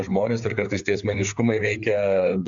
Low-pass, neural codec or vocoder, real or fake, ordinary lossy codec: 7.2 kHz; codec, 16 kHz, 4 kbps, FreqCodec, larger model; fake; MP3, 64 kbps